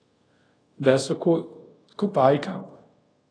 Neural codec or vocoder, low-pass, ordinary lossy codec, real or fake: codec, 24 kHz, 0.5 kbps, DualCodec; 9.9 kHz; AAC, 32 kbps; fake